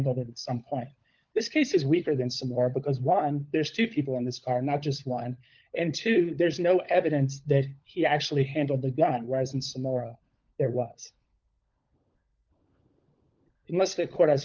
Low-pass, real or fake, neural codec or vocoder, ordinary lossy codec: 7.2 kHz; fake; codec, 16 kHz, 16 kbps, FunCodec, trained on LibriTTS, 50 frames a second; Opus, 24 kbps